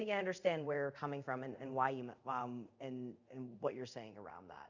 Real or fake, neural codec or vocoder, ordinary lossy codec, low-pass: fake; codec, 24 kHz, 0.5 kbps, DualCodec; Opus, 64 kbps; 7.2 kHz